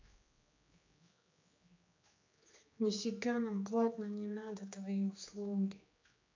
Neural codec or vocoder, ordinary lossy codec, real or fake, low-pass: codec, 16 kHz, 2 kbps, X-Codec, HuBERT features, trained on general audio; MP3, 48 kbps; fake; 7.2 kHz